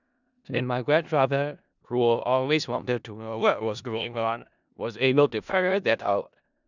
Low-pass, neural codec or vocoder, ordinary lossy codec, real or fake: 7.2 kHz; codec, 16 kHz in and 24 kHz out, 0.4 kbps, LongCat-Audio-Codec, four codebook decoder; none; fake